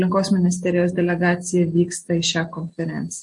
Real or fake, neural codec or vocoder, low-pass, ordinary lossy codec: real; none; 10.8 kHz; MP3, 48 kbps